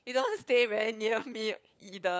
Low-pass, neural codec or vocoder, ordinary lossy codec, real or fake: none; codec, 16 kHz, 4 kbps, FunCodec, trained on LibriTTS, 50 frames a second; none; fake